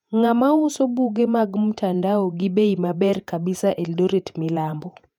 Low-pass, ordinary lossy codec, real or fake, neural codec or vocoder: 19.8 kHz; none; fake; vocoder, 48 kHz, 128 mel bands, Vocos